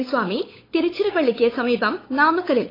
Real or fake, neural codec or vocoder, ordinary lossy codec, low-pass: fake; codec, 16 kHz, 8 kbps, FunCodec, trained on LibriTTS, 25 frames a second; AAC, 24 kbps; 5.4 kHz